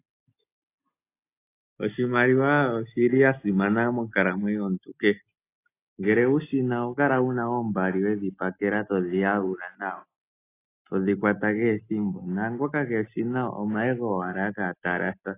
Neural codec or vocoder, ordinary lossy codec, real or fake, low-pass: none; AAC, 24 kbps; real; 3.6 kHz